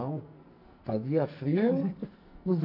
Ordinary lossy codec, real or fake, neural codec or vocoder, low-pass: none; fake; codec, 44.1 kHz, 2.6 kbps, SNAC; 5.4 kHz